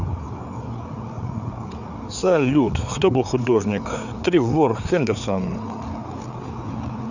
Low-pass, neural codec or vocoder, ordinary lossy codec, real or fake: 7.2 kHz; codec, 16 kHz, 4 kbps, FreqCodec, larger model; none; fake